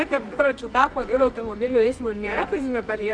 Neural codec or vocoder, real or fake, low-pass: codec, 24 kHz, 0.9 kbps, WavTokenizer, medium music audio release; fake; 9.9 kHz